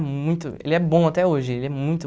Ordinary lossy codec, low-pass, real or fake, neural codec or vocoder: none; none; real; none